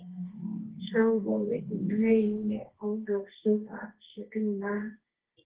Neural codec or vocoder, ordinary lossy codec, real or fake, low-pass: codec, 24 kHz, 0.9 kbps, WavTokenizer, medium music audio release; Opus, 16 kbps; fake; 3.6 kHz